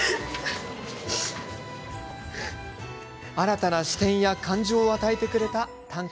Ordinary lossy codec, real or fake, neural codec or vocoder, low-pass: none; real; none; none